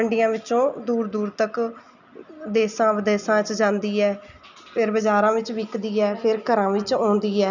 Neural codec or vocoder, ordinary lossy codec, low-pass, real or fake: none; none; 7.2 kHz; real